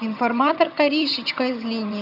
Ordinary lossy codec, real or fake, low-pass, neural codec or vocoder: none; fake; 5.4 kHz; vocoder, 22.05 kHz, 80 mel bands, HiFi-GAN